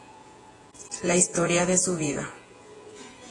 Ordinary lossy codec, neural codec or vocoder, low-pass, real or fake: AAC, 32 kbps; vocoder, 48 kHz, 128 mel bands, Vocos; 10.8 kHz; fake